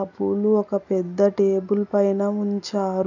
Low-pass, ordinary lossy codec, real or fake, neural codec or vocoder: 7.2 kHz; none; real; none